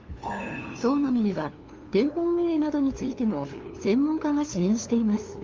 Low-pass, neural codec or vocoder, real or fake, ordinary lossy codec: 7.2 kHz; codec, 16 kHz, 2 kbps, FunCodec, trained on LibriTTS, 25 frames a second; fake; Opus, 32 kbps